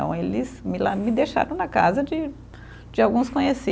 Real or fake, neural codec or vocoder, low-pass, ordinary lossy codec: real; none; none; none